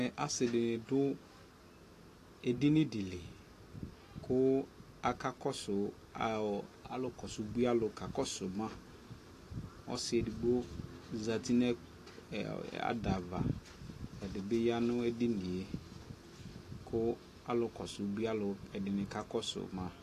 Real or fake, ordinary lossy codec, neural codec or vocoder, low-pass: real; AAC, 48 kbps; none; 14.4 kHz